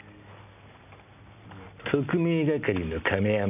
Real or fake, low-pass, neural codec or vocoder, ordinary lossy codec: real; 3.6 kHz; none; none